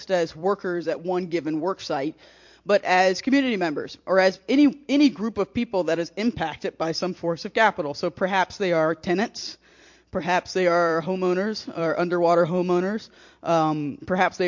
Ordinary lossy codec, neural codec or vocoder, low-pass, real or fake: MP3, 48 kbps; vocoder, 44.1 kHz, 128 mel bands every 256 samples, BigVGAN v2; 7.2 kHz; fake